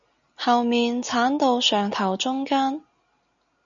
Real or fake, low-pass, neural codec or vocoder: real; 7.2 kHz; none